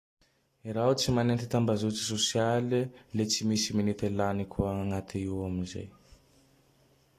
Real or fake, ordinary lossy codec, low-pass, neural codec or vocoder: real; AAC, 48 kbps; 14.4 kHz; none